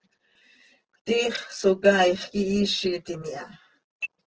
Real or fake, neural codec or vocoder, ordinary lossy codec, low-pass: real; none; Opus, 16 kbps; 7.2 kHz